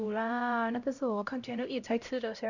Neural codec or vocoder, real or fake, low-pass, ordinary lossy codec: codec, 16 kHz, 0.5 kbps, X-Codec, HuBERT features, trained on LibriSpeech; fake; 7.2 kHz; none